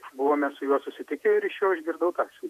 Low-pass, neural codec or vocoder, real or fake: 14.4 kHz; vocoder, 48 kHz, 128 mel bands, Vocos; fake